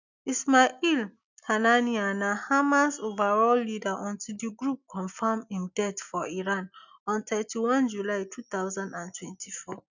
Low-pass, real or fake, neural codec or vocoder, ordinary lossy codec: 7.2 kHz; real; none; none